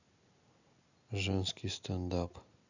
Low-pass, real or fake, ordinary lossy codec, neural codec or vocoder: 7.2 kHz; real; none; none